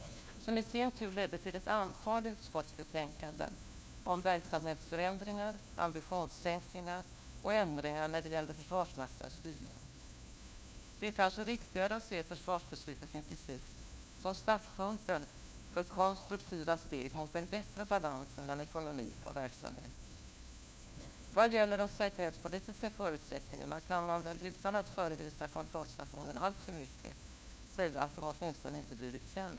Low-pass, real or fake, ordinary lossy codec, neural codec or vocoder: none; fake; none; codec, 16 kHz, 1 kbps, FunCodec, trained on LibriTTS, 50 frames a second